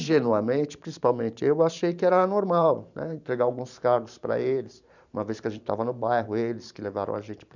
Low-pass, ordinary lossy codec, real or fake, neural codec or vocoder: 7.2 kHz; none; fake; vocoder, 44.1 kHz, 128 mel bands every 512 samples, BigVGAN v2